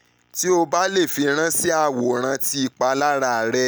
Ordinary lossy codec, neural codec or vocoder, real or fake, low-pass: none; none; real; none